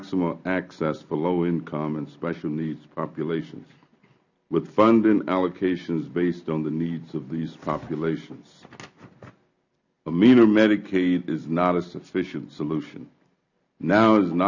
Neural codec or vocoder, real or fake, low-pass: none; real; 7.2 kHz